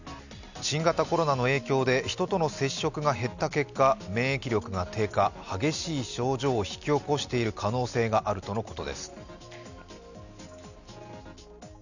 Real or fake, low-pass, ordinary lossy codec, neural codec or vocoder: real; 7.2 kHz; none; none